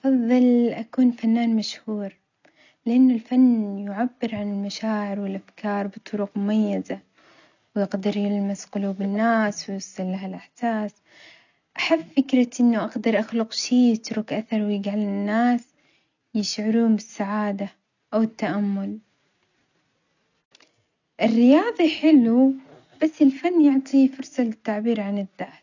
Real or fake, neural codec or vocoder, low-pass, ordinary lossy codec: real; none; 7.2 kHz; none